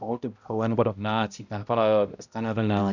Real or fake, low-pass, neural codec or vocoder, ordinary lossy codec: fake; 7.2 kHz; codec, 16 kHz, 0.5 kbps, X-Codec, HuBERT features, trained on balanced general audio; none